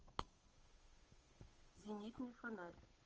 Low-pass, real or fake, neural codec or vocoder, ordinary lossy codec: 7.2 kHz; fake; codec, 16 kHz, 8 kbps, FunCodec, trained on Chinese and English, 25 frames a second; Opus, 24 kbps